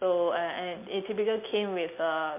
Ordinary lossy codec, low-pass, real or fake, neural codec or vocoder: MP3, 32 kbps; 3.6 kHz; real; none